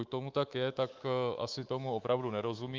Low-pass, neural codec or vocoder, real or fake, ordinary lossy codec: 7.2 kHz; codec, 24 kHz, 3.1 kbps, DualCodec; fake; Opus, 24 kbps